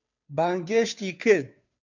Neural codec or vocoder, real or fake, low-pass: codec, 16 kHz, 2 kbps, FunCodec, trained on Chinese and English, 25 frames a second; fake; 7.2 kHz